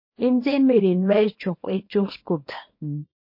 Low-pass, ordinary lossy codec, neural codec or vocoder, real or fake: 5.4 kHz; MP3, 32 kbps; codec, 24 kHz, 0.9 kbps, WavTokenizer, small release; fake